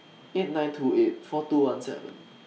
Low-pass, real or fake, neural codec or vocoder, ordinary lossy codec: none; real; none; none